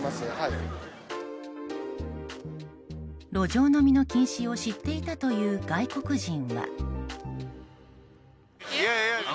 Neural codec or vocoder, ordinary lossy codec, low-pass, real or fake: none; none; none; real